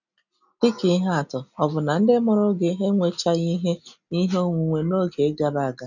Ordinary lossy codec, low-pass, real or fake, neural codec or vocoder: none; 7.2 kHz; real; none